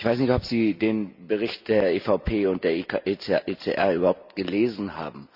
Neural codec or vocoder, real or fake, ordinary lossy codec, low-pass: none; real; none; 5.4 kHz